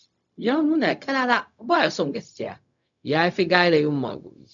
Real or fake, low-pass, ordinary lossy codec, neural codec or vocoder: fake; 7.2 kHz; none; codec, 16 kHz, 0.4 kbps, LongCat-Audio-Codec